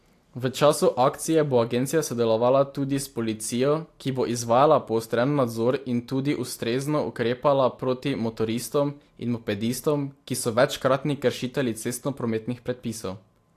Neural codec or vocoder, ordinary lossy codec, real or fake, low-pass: none; AAC, 64 kbps; real; 14.4 kHz